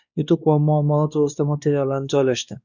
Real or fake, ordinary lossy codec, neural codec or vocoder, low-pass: fake; Opus, 64 kbps; codec, 16 kHz, 2 kbps, X-Codec, WavLM features, trained on Multilingual LibriSpeech; 7.2 kHz